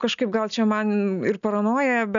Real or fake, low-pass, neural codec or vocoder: real; 7.2 kHz; none